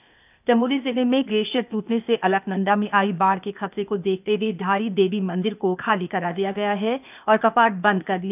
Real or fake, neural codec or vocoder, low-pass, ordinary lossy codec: fake; codec, 16 kHz, 0.8 kbps, ZipCodec; 3.6 kHz; none